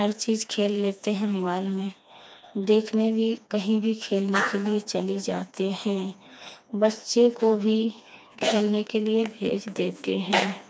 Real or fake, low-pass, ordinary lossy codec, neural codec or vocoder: fake; none; none; codec, 16 kHz, 2 kbps, FreqCodec, smaller model